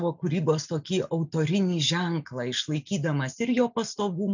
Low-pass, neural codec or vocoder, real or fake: 7.2 kHz; none; real